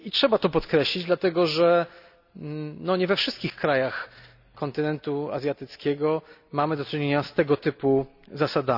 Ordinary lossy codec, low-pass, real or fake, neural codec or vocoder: none; 5.4 kHz; real; none